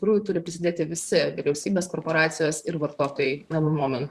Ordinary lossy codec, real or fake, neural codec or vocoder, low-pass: Opus, 64 kbps; fake; vocoder, 44.1 kHz, 128 mel bands, Pupu-Vocoder; 14.4 kHz